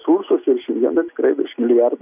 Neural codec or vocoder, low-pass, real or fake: none; 3.6 kHz; real